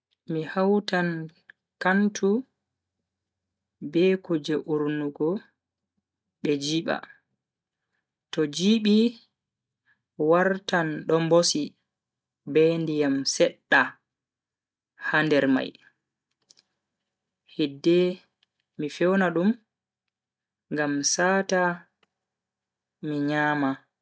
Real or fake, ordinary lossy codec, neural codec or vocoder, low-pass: real; none; none; none